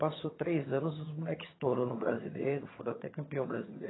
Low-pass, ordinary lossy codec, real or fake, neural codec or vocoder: 7.2 kHz; AAC, 16 kbps; fake; vocoder, 22.05 kHz, 80 mel bands, HiFi-GAN